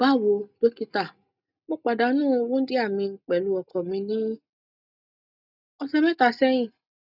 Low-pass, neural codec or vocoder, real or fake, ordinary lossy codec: 5.4 kHz; none; real; none